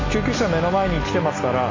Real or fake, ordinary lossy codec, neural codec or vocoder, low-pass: real; MP3, 48 kbps; none; 7.2 kHz